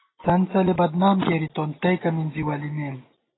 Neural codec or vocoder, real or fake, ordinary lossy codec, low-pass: none; real; AAC, 16 kbps; 7.2 kHz